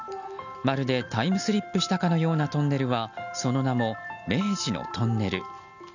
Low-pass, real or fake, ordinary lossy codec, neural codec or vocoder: 7.2 kHz; real; none; none